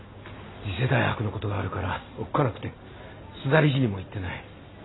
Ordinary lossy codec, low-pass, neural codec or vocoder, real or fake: AAC, 16 kbps; 7.2 kHz; none; real